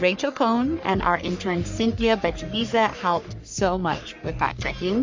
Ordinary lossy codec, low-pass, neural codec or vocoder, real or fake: MP3, 64 kbps; 7.2 kHz; codec, 44.1 kHz, 3.4 kbps, Pupu-Codec; fake